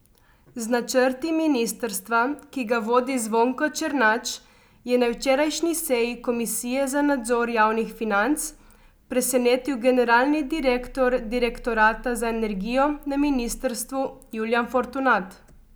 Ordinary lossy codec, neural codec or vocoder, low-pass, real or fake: none; none; none; real